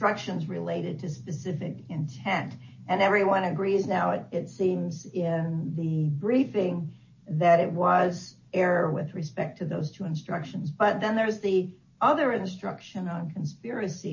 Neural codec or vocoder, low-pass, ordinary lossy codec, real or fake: none; 7.2 kHz; MP3, 32 kbps; real